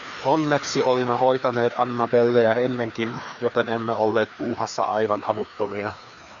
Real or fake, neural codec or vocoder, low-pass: fake; codec, 16 kHz, 2 kbps, FreqCodec, larger model; 7.2 kHz